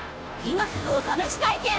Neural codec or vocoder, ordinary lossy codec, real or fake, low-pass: codec, 16 kHz, 0.5 kbps, FunCodec, trained on Chinese and English, 25 frames a second; none; fake; none